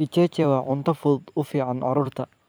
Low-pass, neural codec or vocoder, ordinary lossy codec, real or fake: none; vocoder, 44.1 kHz, 128 mel bands every 256 samples, BigVGAN v2; none; fake